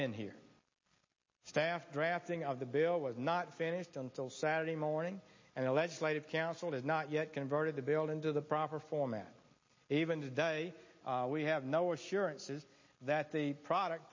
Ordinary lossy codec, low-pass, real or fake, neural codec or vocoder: MP3, 32 kbps; 7.2 kHz; real; none